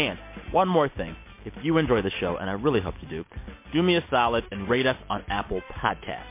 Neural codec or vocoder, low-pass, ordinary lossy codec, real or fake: none; 3.6 kHz; MP3, 24 kbps; real